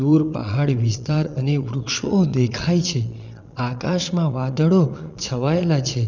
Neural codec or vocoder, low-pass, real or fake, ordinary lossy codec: vocoder, 22.05 kHz, 80 mel bands, Vocos; 7.2 kHz; fake; none